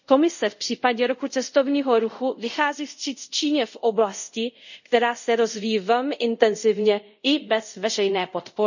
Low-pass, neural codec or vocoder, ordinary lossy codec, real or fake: 7.2 kHz; codec, 24 kHz, 0.5 kbps, DualCodec; none; fake